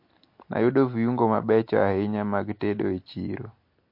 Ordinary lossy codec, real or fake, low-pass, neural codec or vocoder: MP3, 32 kbps; real; 5.4 kHz; none